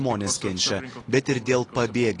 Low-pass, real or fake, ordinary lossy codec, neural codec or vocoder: 10.8 kHz; real; AAC, 32 kbps; none